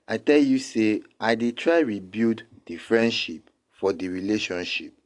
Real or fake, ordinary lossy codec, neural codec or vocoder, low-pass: real; AAC, 48 kbps; none; 10.8 kHz